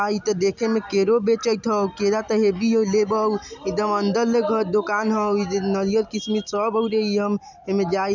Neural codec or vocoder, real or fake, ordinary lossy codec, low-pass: none; real; none; 7.2 kHz